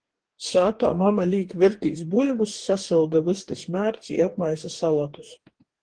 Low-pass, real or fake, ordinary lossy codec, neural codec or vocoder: 9.9 kHz; fake; Opus, 16 kbps; codec, 44.1 kHz, 2.6 kbps, DAC